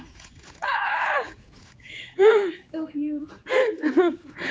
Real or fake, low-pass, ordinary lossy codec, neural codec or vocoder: fake; none; none; codec, 16 kHz, 4 kbps, X-Codec, HuBERT features, trained on general audio